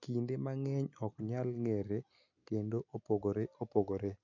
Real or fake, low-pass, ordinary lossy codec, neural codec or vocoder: real; 7.2 kHz; none; none